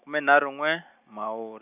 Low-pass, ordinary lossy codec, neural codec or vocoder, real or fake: 3.6 kHz; none; none; real